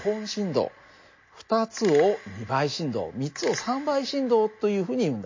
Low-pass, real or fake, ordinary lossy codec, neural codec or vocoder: 7.2 kHz; real; MP3, 32 kbps; none